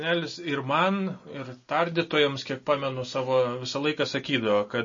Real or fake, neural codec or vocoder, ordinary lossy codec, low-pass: real; none; MP3, 32 kbps; 7.2 kHz